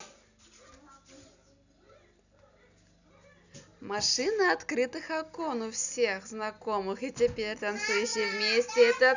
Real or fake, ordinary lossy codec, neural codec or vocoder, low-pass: real; none; none; 7.2 kHz